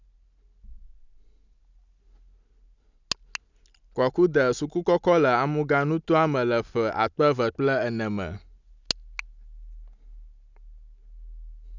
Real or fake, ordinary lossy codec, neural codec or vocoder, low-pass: real; none; none; 7.2 kHz